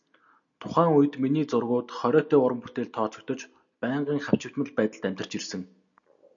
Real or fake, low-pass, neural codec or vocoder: real; 7.2 kHz; none